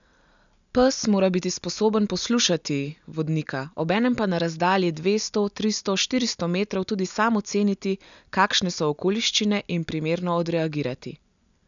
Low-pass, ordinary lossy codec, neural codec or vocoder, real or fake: 7.2 kHz; none; none; real